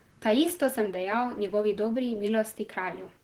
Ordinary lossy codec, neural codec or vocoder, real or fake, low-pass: Opus, 16 kbps; vocoder, 44.1 kHz, 128 mel bands, Pupu-Vocoder; fake; 19.8 kHz